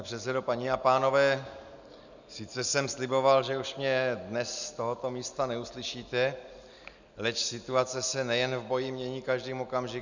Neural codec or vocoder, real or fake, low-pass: none; real; 7.2 kHz